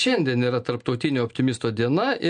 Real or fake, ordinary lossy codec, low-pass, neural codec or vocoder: real; MP3, 64 kbps; 9.9 kHz; none